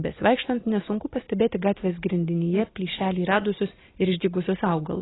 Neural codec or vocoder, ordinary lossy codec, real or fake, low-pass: none; AAC, 16 kbps; real; 7.2 kHz